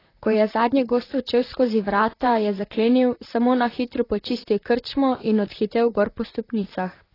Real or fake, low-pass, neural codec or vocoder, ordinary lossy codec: fake; 5.4 kHz; vocoder, 44.1 kHz, 128 mel bands, Pupu-Vocoder; AAC, 24 kbps